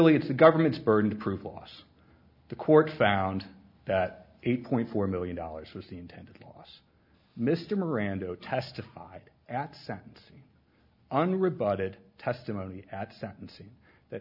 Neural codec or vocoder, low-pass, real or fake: none; 5.4 kHz; real